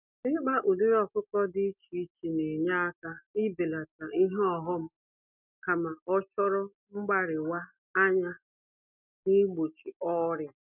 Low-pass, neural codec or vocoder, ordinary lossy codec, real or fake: 3.6 kHz; none; none; real